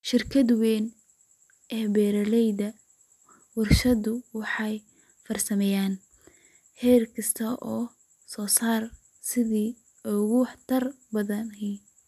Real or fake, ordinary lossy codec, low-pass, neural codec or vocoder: real; none; 14.4 kHz; none